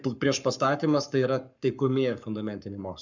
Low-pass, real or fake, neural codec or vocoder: 7.2 kHz; fake; codec, 44.1 kHz, 7.8 kbps, Pupu-Codec